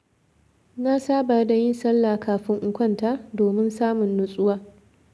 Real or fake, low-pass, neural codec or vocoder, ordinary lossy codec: real; none; none; none